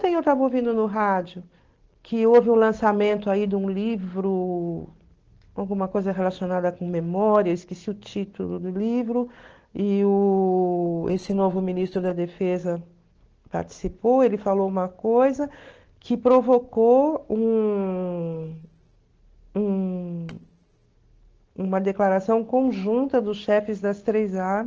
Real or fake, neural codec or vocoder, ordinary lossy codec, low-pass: real; none; Opus, 16 kbps; 7.2 kHz